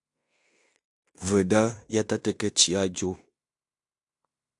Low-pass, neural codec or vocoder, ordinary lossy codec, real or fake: 10.8 kHz; codec, 16 kHz in and 24 kHz out, 0.9 kbps, LongCat-Audio-Codec, fine tuned four codebook decoder; AAC, 64 kbps; fake